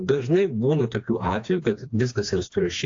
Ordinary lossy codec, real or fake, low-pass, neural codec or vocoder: AAC, 48 kbps; fake; 7.2 kHz; codec, 16 kHz, 2 kbps, FreqCodec, smaller model